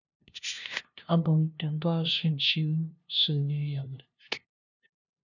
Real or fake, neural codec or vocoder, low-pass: fake; codec, 16 kHz, 0.5 kbps, FunCodec, trained on LibriTTS, 25 frames a second; 7.2 kHz